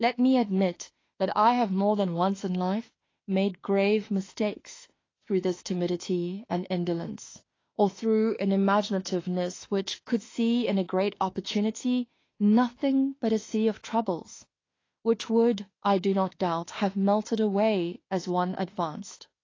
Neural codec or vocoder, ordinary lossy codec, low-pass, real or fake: autoencoder, 48 kHz, 32 numbers a frame, DAC-VAE, trained on Japanese speech; AAC, 32 kbps; 7.2 kHz; fake